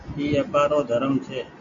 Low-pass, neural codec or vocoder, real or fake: 7.2 kHz; none; real